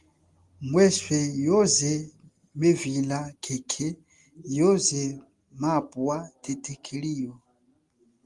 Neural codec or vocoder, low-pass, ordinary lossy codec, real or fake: none; 10.8 kHz; Opus, 24 kbps; real